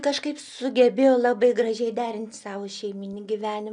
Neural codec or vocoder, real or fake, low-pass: none; real; 9.9 kHz